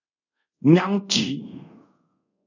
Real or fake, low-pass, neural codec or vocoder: fake; 7.2 kHz; codec, 24 kHz, 0.5 kbps, DualCodec